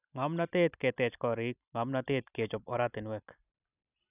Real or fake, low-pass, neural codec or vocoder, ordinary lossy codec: fake; 3.6 kHz; vocoder, 44.1 kHz, 128 mel bands every 256 samples, BigVGAN v2; none